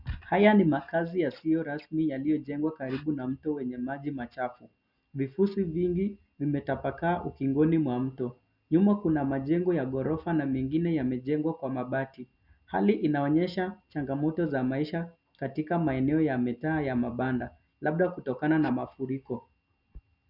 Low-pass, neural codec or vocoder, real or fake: 5.4 kHz; none; real